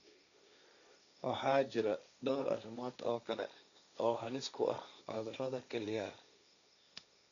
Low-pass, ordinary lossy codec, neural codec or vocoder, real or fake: 7.2 kHz; none; codec, 16 kHz, 1.1 kbps, Voila-Tokenizer; fake